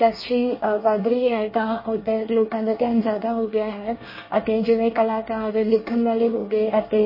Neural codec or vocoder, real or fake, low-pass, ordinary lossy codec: codec, 24 kHz, 1 kbps, SNAC; fake; 5.4 kHz; MP3, 24 kbps